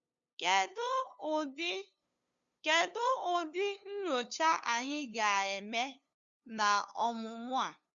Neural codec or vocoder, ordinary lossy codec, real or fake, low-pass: codec, 16 kHz, 2 kbps, FunCodec, trained on LibriTTS, 25 frames a second; Opus, 64 kbps; fake; 7.2 kHz